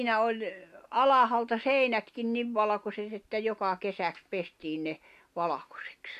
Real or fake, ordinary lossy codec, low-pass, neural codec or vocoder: real; MP3, 64 kbps; 14.4 kHz; none